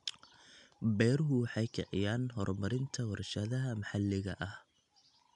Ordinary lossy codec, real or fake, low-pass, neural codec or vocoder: none; real; 10.8 kHz; none